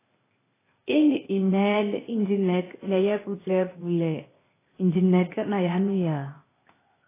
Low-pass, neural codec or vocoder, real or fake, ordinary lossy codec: 3.6 kHz; codec, 16 kHz, 0.8 kbps, ZipCodec; fake; AAC, 16 kbps